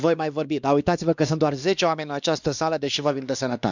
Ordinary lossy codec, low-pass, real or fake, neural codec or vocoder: none; 7.2 kHz; fake; codec, 16 kHz, 2 kbps, X-Codec, WavLM features, trained on Multilingual LibriSpeech